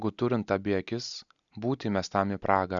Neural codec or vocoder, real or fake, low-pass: none; real; 7.2 kHz